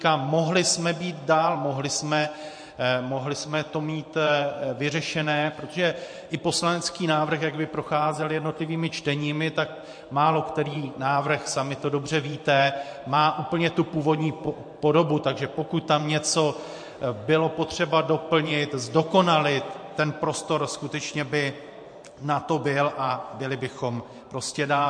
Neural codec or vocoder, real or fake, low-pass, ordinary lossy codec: vocoder, 44.1 kHz, 128 mel bands every 512 samples, BigVGAN v2; fake; 9.9 kHz; MP3, 48 kbps